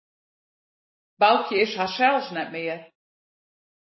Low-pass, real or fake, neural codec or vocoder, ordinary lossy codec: 7.2 kHz; real; none; MP3, 24 kbps